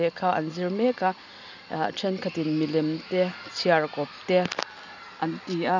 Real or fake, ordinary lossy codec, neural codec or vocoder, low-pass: real; none; none; 7.2 kHz